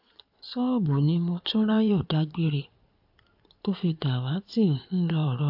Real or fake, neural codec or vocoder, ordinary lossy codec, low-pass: fake; codec, 16 kHz in and 24 kHz out, 2.2 kbps, FireRedTTS-2 codec; AAC, 48 kbps; 5.4 kHz